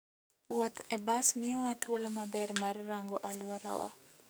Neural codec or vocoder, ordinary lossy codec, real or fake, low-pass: codec, 44.1 kHz, 2.6 kbps, SNAC; none; fake; none